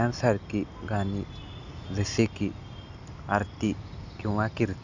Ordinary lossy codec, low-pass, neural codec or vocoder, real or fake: none; 7.2 kHz; none; real